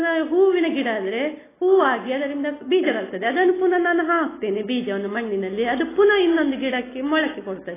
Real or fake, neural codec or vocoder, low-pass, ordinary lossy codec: real; none; 3.6 kHz; AAC, 16 kbps